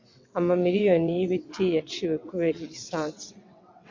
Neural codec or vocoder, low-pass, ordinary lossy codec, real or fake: vocoder, 22.05 kHz, 80 mel bands, WaveNeXt; 7.2 kHz; MP3, 48 kbps; fake